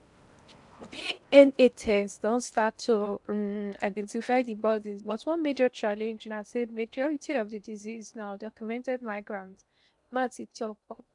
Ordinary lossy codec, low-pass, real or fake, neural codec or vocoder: none; 10.8 kHz; fake; codec, 16 kHz in and 24 kHz out, 0.6 kbps, FocalCodec, streaming, 4096 codes